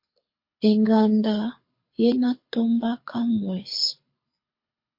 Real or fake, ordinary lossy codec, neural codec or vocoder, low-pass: fake; MP3, 32 kbps; codec, 24 kHz, 6 kbps, HILCodec; 5.4 kHz